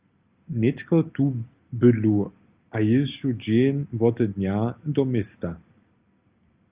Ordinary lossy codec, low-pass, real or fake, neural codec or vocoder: Opus, 24 kbps; 3.6 kHz; real; none